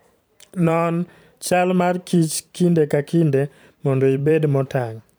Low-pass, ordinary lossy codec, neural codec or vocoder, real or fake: none; none; vocoder, 44.1 kHz, 128 mel bands every 512 samples, BigVGAN v2; fake